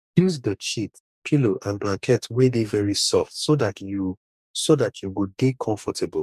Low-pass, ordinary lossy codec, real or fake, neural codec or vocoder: 14.4 kHz; none; fake; codec, 44.1 kHz, 2.6 kbps, DAC